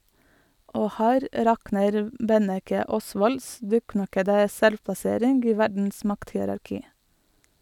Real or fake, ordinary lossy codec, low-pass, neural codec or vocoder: real; none; 19.8 kHz; none